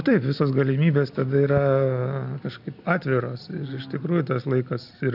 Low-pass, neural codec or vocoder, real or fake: 5.4 kHz; none; real